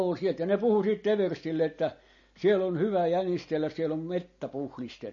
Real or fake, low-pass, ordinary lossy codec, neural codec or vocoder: real; 7.2 kHz; MP3, 32 kbps; none